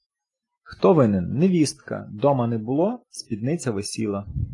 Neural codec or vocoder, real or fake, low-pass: none; real; 10.8 kHz